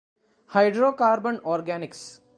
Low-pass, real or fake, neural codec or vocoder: 9.9 kHz; real; none